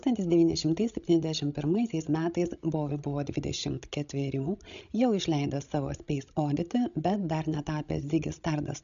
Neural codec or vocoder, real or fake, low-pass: codec, 16 kHz, 16 kbps, FreqCodec, larger model; fake; 7.2 kHz